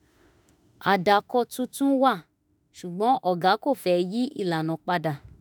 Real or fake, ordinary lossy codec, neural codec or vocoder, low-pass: fake; none; autoencoder, 48 kHz, 32 numbers a frame, DAC-VAE, trained on Japanese speech; none